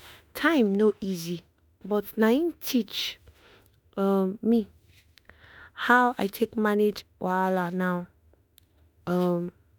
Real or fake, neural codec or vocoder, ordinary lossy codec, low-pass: fake; autoencoder, 48 kHz, 32 numbers a frame, DAC-VAE, trained on Japanese speech; none; none